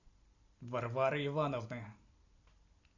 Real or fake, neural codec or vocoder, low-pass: real; none; 7.2 kHz